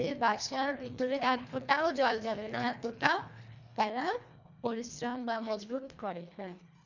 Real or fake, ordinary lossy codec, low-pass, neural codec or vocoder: fake; none; 7.2 kHz; codec, 24 kHz, 1.5 kbps, HILCodec